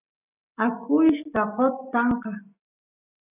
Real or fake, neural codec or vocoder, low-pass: real; none; 3.6 kHz